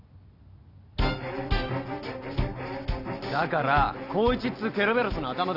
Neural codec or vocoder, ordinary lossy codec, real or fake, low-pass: none; none; real; 5.4 kHz